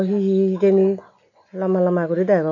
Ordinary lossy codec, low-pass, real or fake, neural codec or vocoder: none; 7.2 kHz; real; none